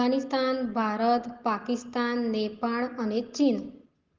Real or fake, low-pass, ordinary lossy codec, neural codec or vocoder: real; 7.2 kHz; Opus, 16 kbps; none